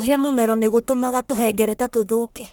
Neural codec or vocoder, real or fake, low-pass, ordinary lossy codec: codec, 44.1 kHz, 1.7 kbps, Pupu-Codec; fake; none; none